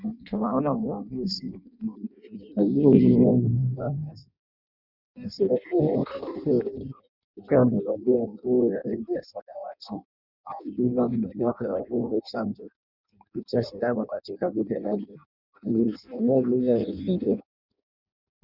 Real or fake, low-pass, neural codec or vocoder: fake; 5.4 kHz; codec, 16 kHz in and 24 kHz out, 0.6 kbps, FireRedTTS-2 codec